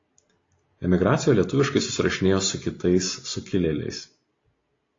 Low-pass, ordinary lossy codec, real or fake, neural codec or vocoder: 7.2 kHz; AAC, 32 kbps; real; none